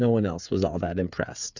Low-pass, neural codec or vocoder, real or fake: 7.2 kHz; codec, 16 kHz, 8 kbps, FreqCodec, smaller model; fake